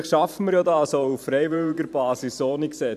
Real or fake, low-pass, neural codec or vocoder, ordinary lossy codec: fake; 14.4 kHz; vocoder, 44.1 kHz, 128 mel bands every 512 samples, BigVGAN v2; none